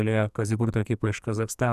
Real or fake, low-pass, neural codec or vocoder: fake; 14.4 kHz; codec, 44.1 kHz, 2.6 kbps, SNAC